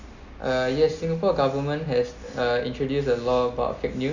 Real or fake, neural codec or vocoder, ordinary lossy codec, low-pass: real; none; none; 7.2 kHz